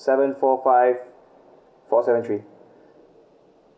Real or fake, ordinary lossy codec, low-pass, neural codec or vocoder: real; none; none; none